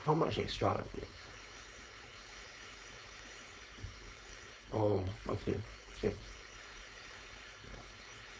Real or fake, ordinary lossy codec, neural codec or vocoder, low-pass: fake; none; codec, 16 kHz, 4.8 kbps, FACodec; none